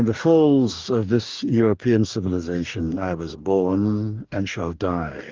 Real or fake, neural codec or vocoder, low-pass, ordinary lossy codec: fake; codec, 44.1 kHz, 2.6 kbps, DAC; 7.2 kHz; Opus, 24 kbps